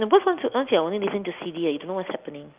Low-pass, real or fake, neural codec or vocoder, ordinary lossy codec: 3.6 kHz; real; none; Opus, 24 kbps